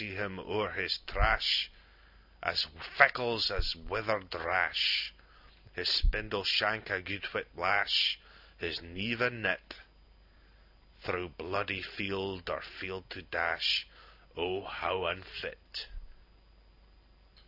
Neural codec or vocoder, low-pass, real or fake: none; 5.4 kHz; real